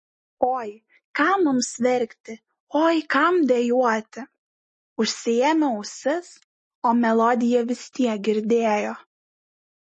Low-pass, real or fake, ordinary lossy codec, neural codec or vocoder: 10.8 kHz; real; MP3, 32 kbps; none